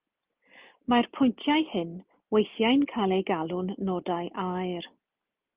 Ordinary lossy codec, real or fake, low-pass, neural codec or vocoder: Opus, 24 kbps; real; 3.6 kHz; none